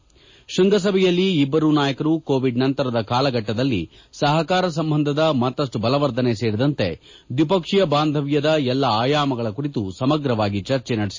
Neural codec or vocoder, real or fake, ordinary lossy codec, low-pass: none; real; MP3, 32 kbps; 7.2 kHz